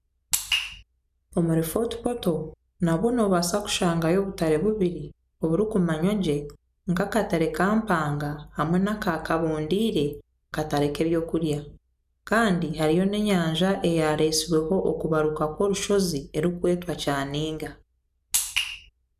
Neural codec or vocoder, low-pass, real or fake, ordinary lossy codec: none; 14.4 kHz; real; none